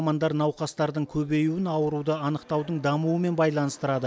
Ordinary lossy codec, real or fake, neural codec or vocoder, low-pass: none; real; none; none